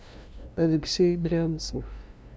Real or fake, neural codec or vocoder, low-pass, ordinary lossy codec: fake; codec, 16 kHz, 0.5 kbps, FunCodec, trained on LibriTTS, 25 frames a second; none; none